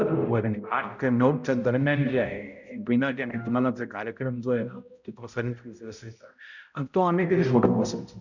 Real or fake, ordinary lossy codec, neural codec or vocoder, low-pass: fake; none; codec, 16 kHz, 0.5 kbps, X-Codec, HuBERT features, trained on balanced general audio; 7.2 kHz